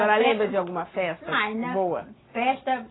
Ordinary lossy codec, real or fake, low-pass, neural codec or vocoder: AAC, 16 kbps; real; 7.2 kHz; none